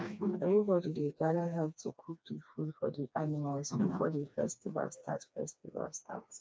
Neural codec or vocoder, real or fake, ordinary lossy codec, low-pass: codec, 16 kHz, 2 kbps, FreqCodec, smaller model; fake; none; none